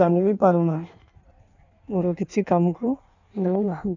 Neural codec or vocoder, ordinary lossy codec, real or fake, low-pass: codec, 16 kHz in and 24 kHz out, 1.1 kbps, FireRedTTS-2 codec; none; fake; 7.2 kHz